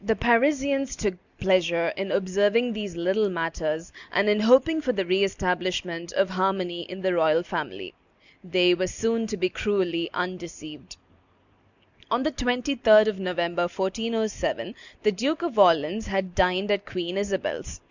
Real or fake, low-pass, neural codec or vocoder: real; 7.2 kHz; none